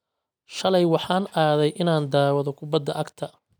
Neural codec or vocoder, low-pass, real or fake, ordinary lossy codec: none; none; real; none